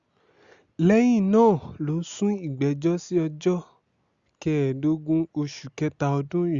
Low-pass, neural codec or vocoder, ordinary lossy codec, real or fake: 7.2 kHz; none; Opus, 64 kbps; real